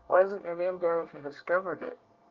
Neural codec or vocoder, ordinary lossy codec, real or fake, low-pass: codec, 24 kHz, 1 kbps, SNAC; Opus, 24 kbps; fake; 7.2 kHz